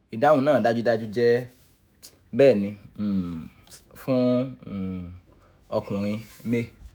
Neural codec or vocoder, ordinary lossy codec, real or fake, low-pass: autoencoder, 48 kHz, 128 numbers a frame, DAC-VAE, trained on Japanese speech; none; fake; none